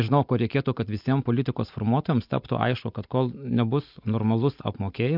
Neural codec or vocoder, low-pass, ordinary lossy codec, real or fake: none; 5.4 kHz; MP3, 48 kbps; real